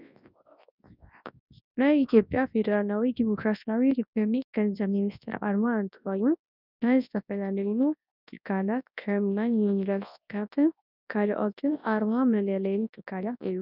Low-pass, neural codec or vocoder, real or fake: 5.4 kHz; codec, 24 kHz, 0.9 kbps, WavTokenizer, large speech release; fake